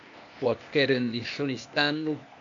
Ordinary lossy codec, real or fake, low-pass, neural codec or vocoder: AAC, 64 kbps; fake; 7.2 kHz; codec, 16 kHz, 0.8 kbps, ZipCodec